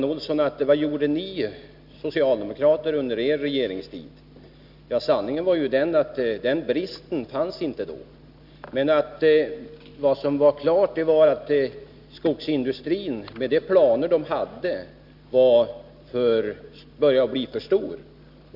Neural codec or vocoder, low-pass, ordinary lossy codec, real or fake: none; 5.4 kHz; none; real